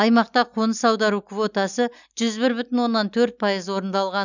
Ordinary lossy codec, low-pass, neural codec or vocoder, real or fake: none; 7.2 kHz; none; real